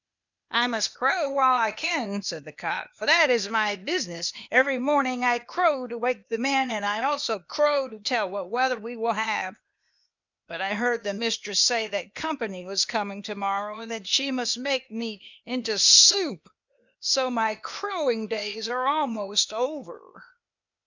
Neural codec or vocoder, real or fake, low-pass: codec, 16 kHz, 0.8 kbps, ZipCodec; fake; 7.2 kHz